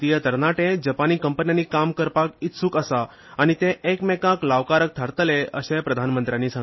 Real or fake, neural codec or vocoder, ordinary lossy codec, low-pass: real; none; MP3, 24 kbps; 7.2 kHz